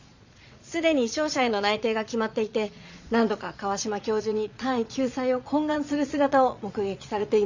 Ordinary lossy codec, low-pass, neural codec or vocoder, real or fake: Opus, 64 kbps; 7.2 kHz; vocoder, 22.05 kHz, 80 mel bands, Vocos; fake